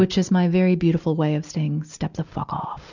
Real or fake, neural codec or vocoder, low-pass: real; none; 7.2 kHz